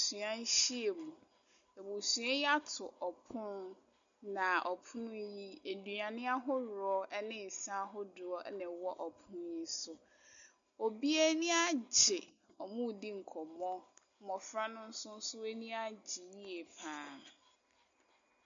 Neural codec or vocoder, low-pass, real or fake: none; 7.2 kHz; real